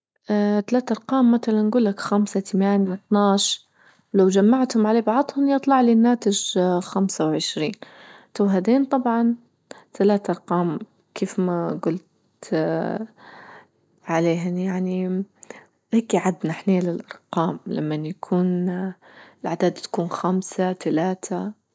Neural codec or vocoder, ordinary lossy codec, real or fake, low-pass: none; none; real; none